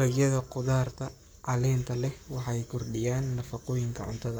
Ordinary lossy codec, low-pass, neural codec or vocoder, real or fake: none; none; codec, 44.1 kHz, 7.8 kbps, Pupu-Codec; fake